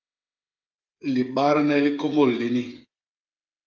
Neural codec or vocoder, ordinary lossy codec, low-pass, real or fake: codec, 16 kHz, 8 kbps, FreqCodec, smaller model; Opus, 32 kbps; 7.2 kHz; fake